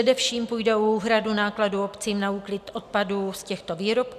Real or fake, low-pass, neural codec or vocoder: real; 14.4 kHz; none